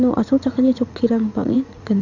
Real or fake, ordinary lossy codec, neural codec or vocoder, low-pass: fake; none; vocoder, 44.1 kHz, 80 mel bands, Vocos; 7.2 kHz